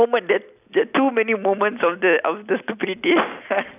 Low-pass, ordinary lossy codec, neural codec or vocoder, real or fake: 3.6 kHz; none; none; real